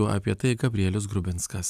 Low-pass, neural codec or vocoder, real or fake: 14.4 kHz; none; real